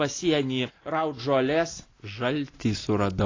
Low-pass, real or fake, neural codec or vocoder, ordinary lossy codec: 7.2 kHz; real; none; AAC, 32 kbps